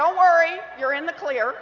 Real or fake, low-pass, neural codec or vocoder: real; 7.2 kHz; none